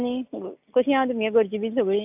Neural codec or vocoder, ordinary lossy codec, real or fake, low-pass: none; AAC, 32 kbps; real; 3.6 kHz